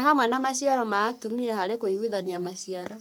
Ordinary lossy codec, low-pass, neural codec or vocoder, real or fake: none; none; codec, 44.1 kHz, 3.4 kbps, Pupu-Codec; fake